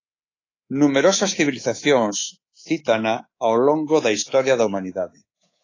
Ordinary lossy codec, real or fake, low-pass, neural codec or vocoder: AAC, 32 kbps; fake; 7.2 kHz; codec, 24 kHz, 3.1 kbps, DualCodec